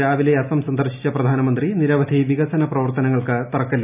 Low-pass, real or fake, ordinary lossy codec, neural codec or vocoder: 3.6 kHz; real; none; none